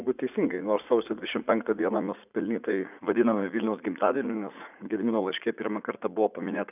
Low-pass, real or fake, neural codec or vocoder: 3.6 kHz; fake; vocoder, 44.1 kHz, 80 mel bands, Vocos